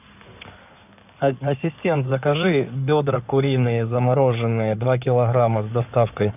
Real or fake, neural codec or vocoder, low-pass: fake; codec, 16 kHz in and 24 kHz out, 2.2 kbps, FireRedTTS-2 codec; 3.6 kHz